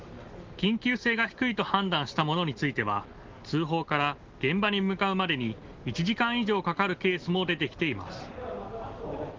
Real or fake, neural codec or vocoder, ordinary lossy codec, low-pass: real; none; Opus, 16 kbps; 7.2 kHz